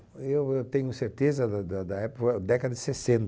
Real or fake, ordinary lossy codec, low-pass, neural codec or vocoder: real; none; none; none